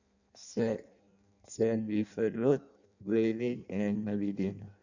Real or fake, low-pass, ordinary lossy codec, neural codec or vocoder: fake; 7.2 kHz; none; codec, 16 kHz in and 24 kHz out, 0.6 kbps, FireRedTTS-2 codec